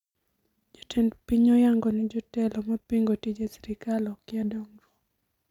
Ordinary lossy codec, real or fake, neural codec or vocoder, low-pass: none; real; none; 19.8 kHz